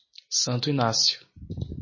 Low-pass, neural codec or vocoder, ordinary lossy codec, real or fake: 7.2 kHz; none; MP3, 32 kbps; real